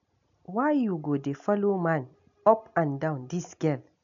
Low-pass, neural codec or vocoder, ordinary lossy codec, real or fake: 7.2 kHz; none; none; real